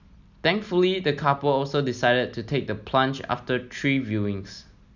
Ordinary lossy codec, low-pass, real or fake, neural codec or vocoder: none; 7.2 kHz; real; none